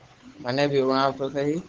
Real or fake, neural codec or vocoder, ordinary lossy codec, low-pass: fake; codec, 16 kHz, 8 kbps, FunCodec, trained on Chinese and English, 25 frames a second; Opus, 16 kbps; 7.2 kHz